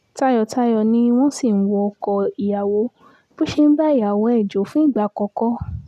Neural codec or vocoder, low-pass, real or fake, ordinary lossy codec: none; 14.4 kHz; real; none